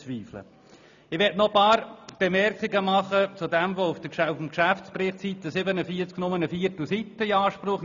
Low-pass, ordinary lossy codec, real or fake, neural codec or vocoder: 7.2 kHz; none; real; none